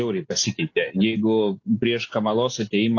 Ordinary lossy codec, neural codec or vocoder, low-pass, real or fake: AAC, 48 kbps; none; 7.2 kHz; real